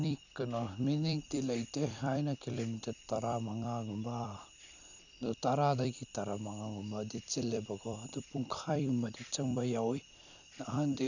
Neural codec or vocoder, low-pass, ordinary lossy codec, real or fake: vocoder, 44.1 kHz, 128 mel bands every 256 samples, BigVGAN v2; 7.2 kHz; none; fake